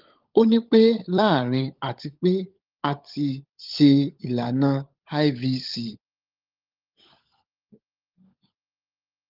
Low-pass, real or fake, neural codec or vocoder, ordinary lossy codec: 5.4 kHz; fake; codec, 16 kHz, 16 kbps, FunCodec, trained on LibriTTS, 50 frames a second; Opus, 32 kbps